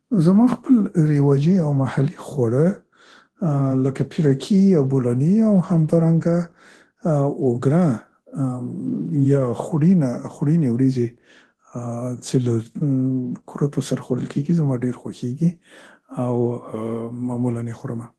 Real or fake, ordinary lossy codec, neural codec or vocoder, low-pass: fake; Opus, 16 kbps; codec, 24 kHz, 0.9 kbps, DualCodec; 10.8 kHz